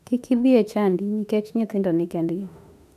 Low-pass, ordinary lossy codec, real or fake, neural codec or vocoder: 14.4 kHz; none; fake; autoencoder, 48 kHz, 32 numbers a frame, DAC-VAE, trained on Japanese speech